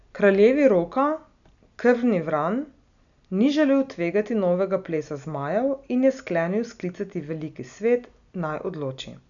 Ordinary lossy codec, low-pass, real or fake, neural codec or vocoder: none; 7.2 kHz; real; none